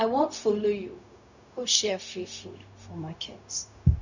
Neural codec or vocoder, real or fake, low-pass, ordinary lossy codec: codec, 16 kHz, 0.4 kbps, LongCat-Audio-Codec; fake; 7.2 kHz; none